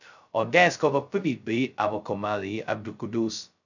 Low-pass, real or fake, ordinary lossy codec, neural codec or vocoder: 7.2 kHz; fake; none; codec, 16 kHz, 0.2 kbps, FocalCodec